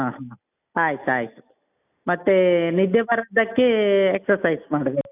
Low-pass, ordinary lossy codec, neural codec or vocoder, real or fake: 3.6 kHz; none; none; real